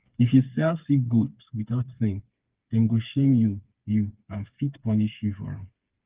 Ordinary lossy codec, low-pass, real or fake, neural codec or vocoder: Opus, 32 kbps; 3.6 kHz; fake; codec, 16 kHz, 4 kbps, FreqCodec, smaller model